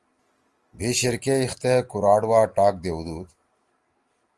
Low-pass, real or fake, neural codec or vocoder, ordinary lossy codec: 10.8 kHz; real; none; Opus, 24 kbps